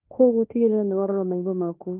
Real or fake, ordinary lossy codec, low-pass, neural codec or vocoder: fake; Opus, 24 kbps; 3.6 kHz; codec, 16 kHz in and 24 kHz out, 0.9 kbps, LongCat-Audio-Codec, fine tuned four codebook decoder